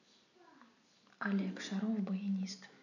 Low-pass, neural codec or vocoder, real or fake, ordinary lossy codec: 7.2 kHz; none; real; none